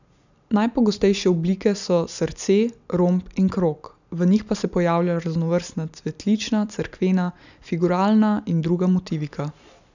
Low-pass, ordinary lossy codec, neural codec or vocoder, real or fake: 7.2 kHz; none; none; real